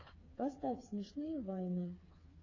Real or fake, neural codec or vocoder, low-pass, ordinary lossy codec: fake; codec, 16 kHz, 4 kbps, FreqCodec, smaller model; 7.2 kHz; AAC, 32 kbps